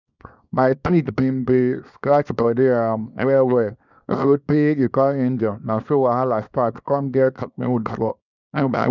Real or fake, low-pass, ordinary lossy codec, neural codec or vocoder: fake; 7.2 kHz; none; codec, 24 kHz, 0.9 kbps, WavTokenizer, small release